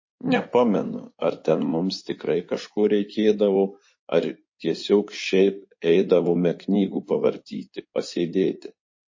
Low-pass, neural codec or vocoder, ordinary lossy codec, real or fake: 7.2 kHz; codec, 16 kHz in and 24 kHz out, 2.2 kbps, FireRedTTS-2 codec; MP3, 32 kbps; fake